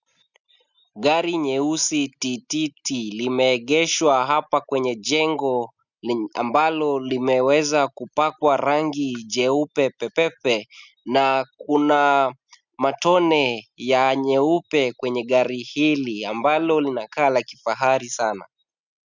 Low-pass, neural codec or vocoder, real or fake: 7.2 kHz; none; real